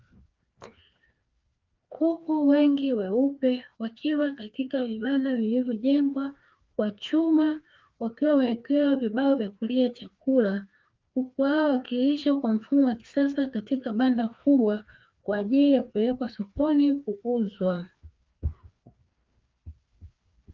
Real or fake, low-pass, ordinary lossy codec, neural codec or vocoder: fake; 7.2 kHz; Opus, 32 kbps; codec, 16 kHz, 2 kbps, FreqCodec, larger model